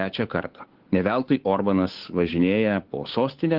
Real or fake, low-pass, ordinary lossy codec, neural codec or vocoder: fake; 5.4 kHz; Opus, 16 kbps; codec, 16 kHz, 8 kbps, FunCodec, trained on Chinese and English, 25 frames a second